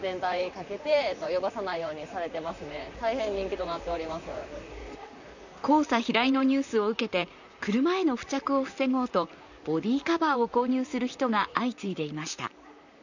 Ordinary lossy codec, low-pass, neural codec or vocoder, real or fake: none; 7.2 kHz; vocoder, 44.1 kHz, 128 mel bands, Pupu-Vocoder; fake